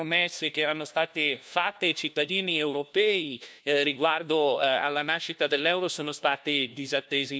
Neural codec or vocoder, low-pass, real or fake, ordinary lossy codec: codec, 16 kHz, 1 kbps, FunCodec, trained on LibriTTS, 50 frames a second; none; fake; none